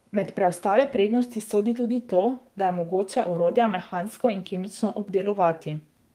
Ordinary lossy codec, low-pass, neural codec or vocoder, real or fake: Opus, 32 kbps; 14.4 kHz; codec, 32 kHz, 1.9 kbps, SNAC; fake